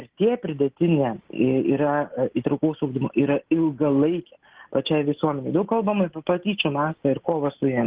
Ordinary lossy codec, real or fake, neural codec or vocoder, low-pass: Opus, 16 kbps; real; none; 3.6 kHz